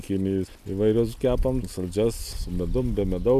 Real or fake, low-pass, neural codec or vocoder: real; 14.4 kHz; none